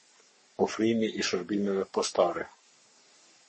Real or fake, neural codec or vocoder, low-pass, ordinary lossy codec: fake; codec, 44.1 kHz, 3.4 kbps, Pupu-Codec; 10.8 kHz; MP3, 32 kbps